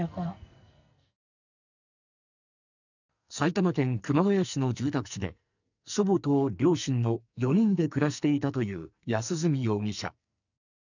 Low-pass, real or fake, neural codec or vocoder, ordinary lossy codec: 7.2 kHz; fake; codec, 44.1 kHz, 2.6 kbps, SNAC; none